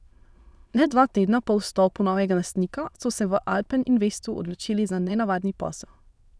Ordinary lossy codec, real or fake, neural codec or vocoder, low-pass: none; fake; autoencoder, 22.05 kHz, a latent of 192 numbers a frame, VITS, trained on many speakers; none